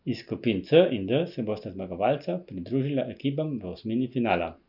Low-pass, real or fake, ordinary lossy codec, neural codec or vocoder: 5.4 kHz; real; none; none